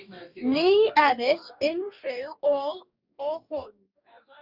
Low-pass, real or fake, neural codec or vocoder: 5.4 kHz; fake; codec, 44.1 kHz, 2.6 kbps, DAC